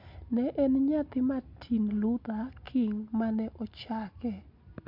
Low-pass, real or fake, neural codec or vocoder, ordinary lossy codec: 5.4 kHz; real; none; MP3, 48 kbps